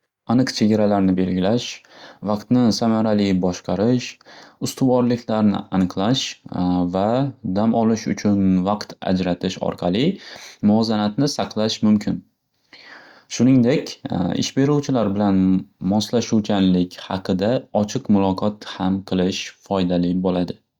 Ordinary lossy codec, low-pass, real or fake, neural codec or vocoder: Opus, 64 kbps; 19.8 kHz; real; none